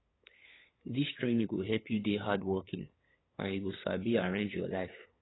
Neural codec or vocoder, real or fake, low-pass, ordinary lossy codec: codec, 16 kHz, 8 kbps, FunCodec, trained on LibriTTS, 25 frames a second; fake; 7.2 kHz; AAC, 16 kbps